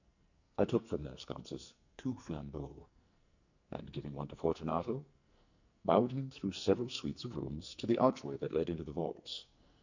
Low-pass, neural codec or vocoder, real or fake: 7.2 kHz; codec, 44.1 kHz, 2.6 kbps, SNAC; fake